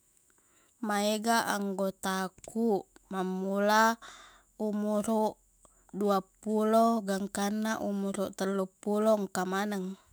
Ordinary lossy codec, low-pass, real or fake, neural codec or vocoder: none; none; fake; autoencoder, 48 kHz, 128 numbers a frame, DAC-VAE, trained on Japanese speech